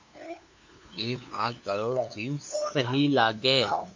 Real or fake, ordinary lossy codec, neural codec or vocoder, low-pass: fake; MP3, 48 kbps; codec, 16 kHz, 2 kbps, FunCodec, trained on LibriTTS, 25 frames a second; 7.2 kHz